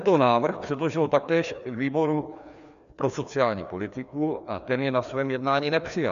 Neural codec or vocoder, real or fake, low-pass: codec, 16 kHz, 2 kbps, FreqCodec, larger model; fake; 7.2 kHz